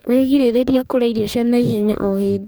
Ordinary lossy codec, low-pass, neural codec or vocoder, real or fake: none; none; codec, 44.1 kHz, 2.6 kbps, DAC; fake